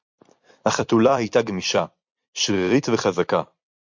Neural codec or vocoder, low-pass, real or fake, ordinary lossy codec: vocoder, 44.1 kHz, 128 mel bands every 256 samples, BigVGAN v2; 7.2 kHz; fake; MP3, 48 kbps